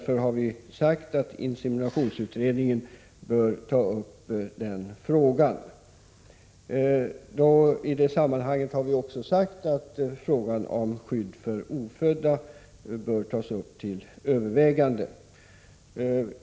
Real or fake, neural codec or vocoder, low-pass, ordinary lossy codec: real; none; none; none